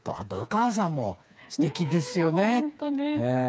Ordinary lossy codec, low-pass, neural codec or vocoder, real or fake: none; none; codec, 16 kHz, 4 kbps, FreqCodec, smaller model; fake